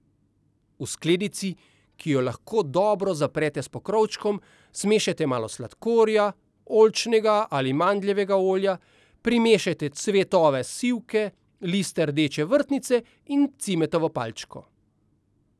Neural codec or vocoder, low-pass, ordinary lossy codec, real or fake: none; none; none; real